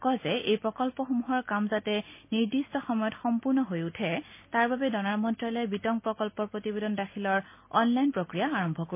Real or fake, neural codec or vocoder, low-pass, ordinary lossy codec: real; none; 3.6 kHz; MP3, 24 kbps